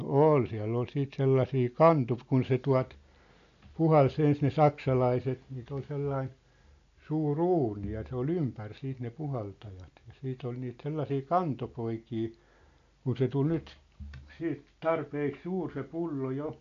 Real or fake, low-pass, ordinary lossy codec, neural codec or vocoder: real; 7.2 kHz; AAC, 48 kbps; none